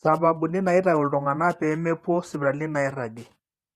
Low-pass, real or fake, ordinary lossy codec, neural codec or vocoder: 14.4 kHz; fake; Opus, 64 kbps; vocoder, 48 kHz, 128 mel bands, Vocos